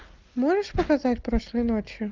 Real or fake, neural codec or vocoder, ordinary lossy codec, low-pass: real; none; Opus, 32 kbps; 7.2 kHz